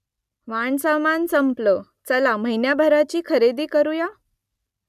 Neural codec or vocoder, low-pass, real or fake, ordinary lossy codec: none; 14.4 kHz; real; none